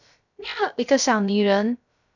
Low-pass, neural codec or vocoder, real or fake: 7.2 kHz; codec, 16 kHz, 0.3 kbps, FocalCodec; fake